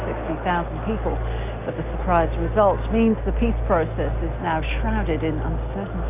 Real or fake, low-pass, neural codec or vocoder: real; 3.6 kHz; none